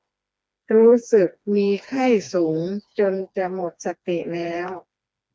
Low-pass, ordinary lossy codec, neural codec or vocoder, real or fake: none; none; codec, 16 kHz, 2 kbps, FreqCodec, smaller model; fake